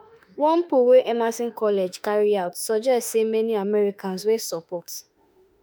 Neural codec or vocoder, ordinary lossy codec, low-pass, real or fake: autoencoder, 48 kHz, 32 numbers a frame, DAC-VAE, trained on Japanese speech; none; none; fake